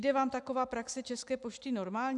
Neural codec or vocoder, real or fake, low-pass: none; real; 10.8 kHz